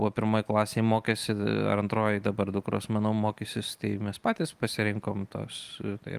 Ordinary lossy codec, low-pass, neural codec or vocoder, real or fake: Opus, 32 kbps; 14.4 kHz; none; real